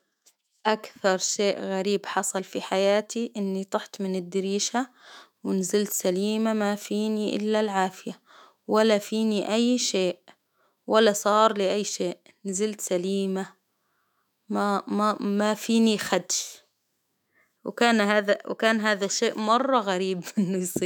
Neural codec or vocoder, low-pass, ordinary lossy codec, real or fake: autoencoder, 48 kHz, 128 numbers a frame, DAC-VAE, trained on Japanese speech; 19.8 kHz; none; fake